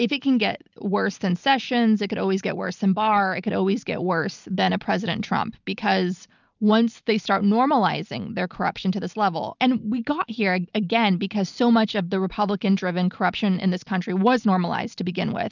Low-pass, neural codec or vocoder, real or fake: 7.2 kHz; none; real